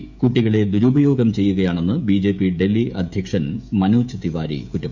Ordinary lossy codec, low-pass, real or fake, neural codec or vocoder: none; 7.2 kHz; fake; codec, 16 kHz, 16 kbps, FreqCodec, smaller model